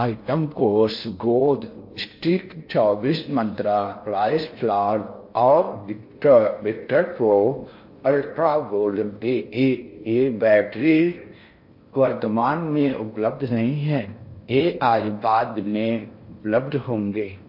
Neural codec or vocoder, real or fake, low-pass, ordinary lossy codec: codec, 16 kHz in and 24 kHz out, 0.6 kbps, FocalCodec, streaming, 4096 codes; fake; 5.4 kHz; MP3, 32 kbps